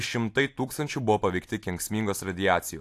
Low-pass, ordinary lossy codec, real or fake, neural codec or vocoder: 14.4 kHz; AAC, 64 kbps; real; none